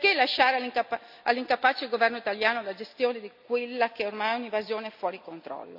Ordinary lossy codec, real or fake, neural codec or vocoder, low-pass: none; real; none; 5.4 kHz